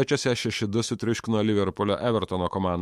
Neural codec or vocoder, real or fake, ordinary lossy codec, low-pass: autoencoder, 48 kHz, 128 numbers a frame, DAC-VAE, trained on Japanese speech; fake; MP3, 64 kbps; 19.8 kHz